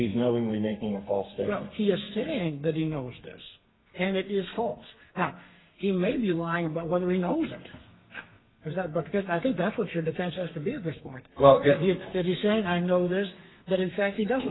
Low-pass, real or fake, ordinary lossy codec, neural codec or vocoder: 7.2 kHz; fake; AAC, 16 kbps; codec, 32 kHz, 1.9 kbps, SNAC